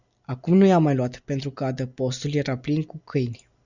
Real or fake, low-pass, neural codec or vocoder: real; 7.2 kHz; none